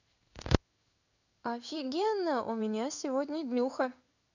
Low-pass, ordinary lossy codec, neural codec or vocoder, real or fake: 7.2 kHz; none; codec, 16 kHz in and 24 kHz out, 1 kbps, XY-Tokenizer; fake